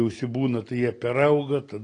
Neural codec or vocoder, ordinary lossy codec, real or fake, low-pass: none; AAC, 32 kbps; real; 9.9 kHz